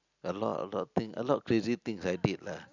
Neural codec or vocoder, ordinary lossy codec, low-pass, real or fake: none; none; 7.2 kHz; real